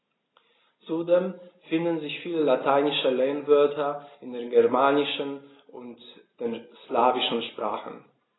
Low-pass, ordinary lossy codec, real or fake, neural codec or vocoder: 7.2 kHz; AAC, 16 kbps; real; none